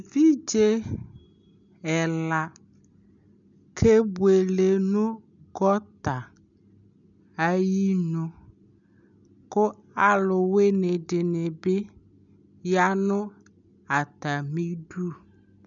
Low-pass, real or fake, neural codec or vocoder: 7.2 kHz; real; none